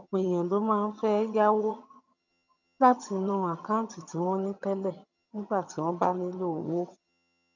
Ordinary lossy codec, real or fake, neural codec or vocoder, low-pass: none; fake; vocoder, 22.05 kHz, 80 mel bands, HiFi-GAN; 7.2 kHz